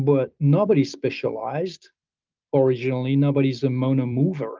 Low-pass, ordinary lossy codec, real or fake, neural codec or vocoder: 7.2 kHz; Opus, 24 kbps; real; none